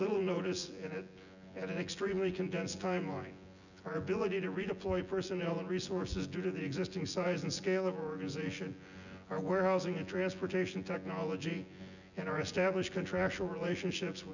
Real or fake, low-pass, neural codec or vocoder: fake; 7.2 kHz; vocoder, 24 kHz, 100 mel bands, Vocos